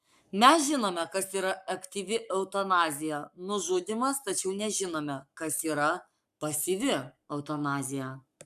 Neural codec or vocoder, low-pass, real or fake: codec, 44.1 kHz, 7.8 kbps, Pupu-Codec; 14.4 kHz; fake